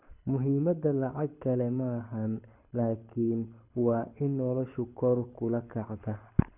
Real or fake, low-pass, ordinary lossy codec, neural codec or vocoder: fake; 3.6 kHz; none; codec, 24 kHz, 6 kbps, HILCodec